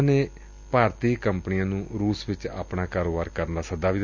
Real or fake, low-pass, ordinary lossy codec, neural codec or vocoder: real; 7.2 kHz; none; none